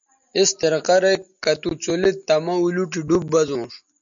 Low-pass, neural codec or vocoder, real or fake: 7.2 kHz; none; real